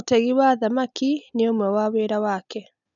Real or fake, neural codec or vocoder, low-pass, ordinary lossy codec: real; none; 7.2 kHz; none